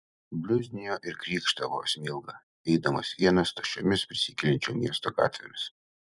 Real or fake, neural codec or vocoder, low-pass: fake; vocoder, 44.1 kHz, 128 mel bands every 256 samples, BigVGAN v2; 10.8 kHz